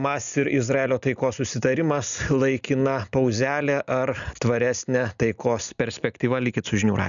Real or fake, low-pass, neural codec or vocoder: real; 7.2 kHz; none